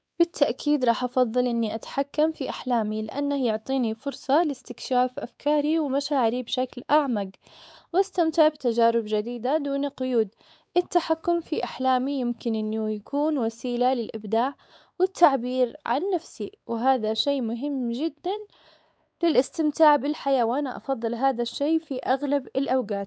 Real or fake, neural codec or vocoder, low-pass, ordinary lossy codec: fake; codec, 16 kHz, 4 kbps, X-Codec, WavLM features, trained on Multilingual LibriSpeech; none; none